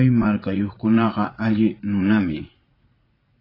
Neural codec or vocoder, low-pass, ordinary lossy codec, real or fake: vocoder, 44.1 kHz, 80 mel bands, Vocos; 5.4 kHz; AAC, 24 kbps; fake